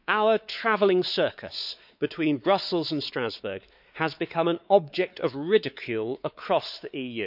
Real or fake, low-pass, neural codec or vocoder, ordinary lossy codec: fake; 5.4 kHz; codec, 16 kHz, 4 kbps, X-Codec, WavLM features, trained on Multilingual LibriSpeech; none